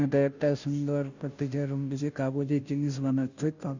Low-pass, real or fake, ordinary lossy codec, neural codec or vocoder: 7.2 kHz; fake; none; codec, 16 kHz, 0.5 kbps, FunCodec, trained on Chinese and English, 25 frames a second